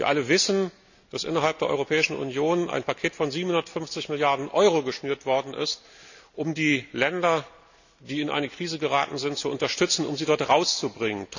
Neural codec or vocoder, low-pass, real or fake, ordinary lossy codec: none; 7.2 kHz; real; none